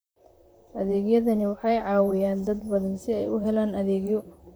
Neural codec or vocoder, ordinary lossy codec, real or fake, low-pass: vocoder, 44.1 kHz, 128 mel bands, Pupu-Vocoder; none; fake; none